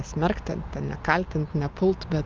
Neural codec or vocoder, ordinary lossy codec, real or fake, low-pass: none; Opus, 32 kbps; real; 7.2 kHz